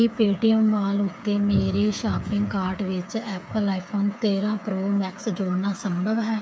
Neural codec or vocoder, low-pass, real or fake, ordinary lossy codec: codec, 16 kHz, 8 kbps, FreqCodec, smaller model; none; fake; none